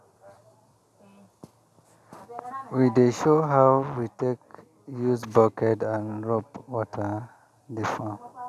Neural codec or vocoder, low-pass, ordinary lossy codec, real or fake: none; 14.4 kHz; none; real